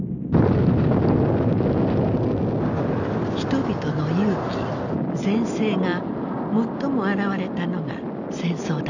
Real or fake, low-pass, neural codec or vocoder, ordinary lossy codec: real; 7.2 kHz; none; none